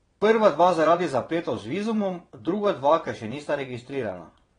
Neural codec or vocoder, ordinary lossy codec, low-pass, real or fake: vocoder, 22.05 kHz, 80 mel bands, WaveNeXt; AAC, 32 kbps; 9.9 kHz; fake